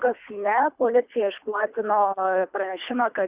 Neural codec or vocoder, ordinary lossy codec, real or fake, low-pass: codec, 24 kHz, 3 kbps, HILCodec; Opus, 64 kbps; fake; 3.6 kHz